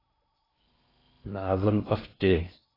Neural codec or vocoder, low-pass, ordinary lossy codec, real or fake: codec, 16 kHz in and 24 kHz out, 0.8 kbps, FocalCodec, streaming, 65536 codes; 5.4 kHz; AAC, 24 kbps; fake